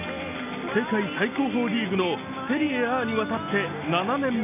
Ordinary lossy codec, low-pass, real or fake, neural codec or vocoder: none; 3.6 kHz; real; none